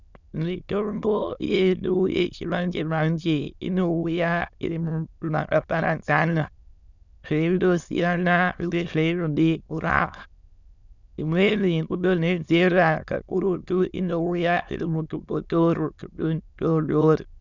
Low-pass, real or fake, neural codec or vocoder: 7.2 kHz; fake; autoencoder, 22.05 kHz, a latent of 192 numbers a frame, VITS, trained on many speakers